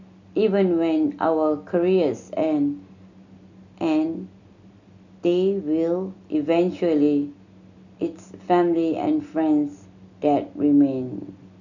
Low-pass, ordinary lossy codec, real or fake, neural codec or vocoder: 7.2 kHz; none; real; none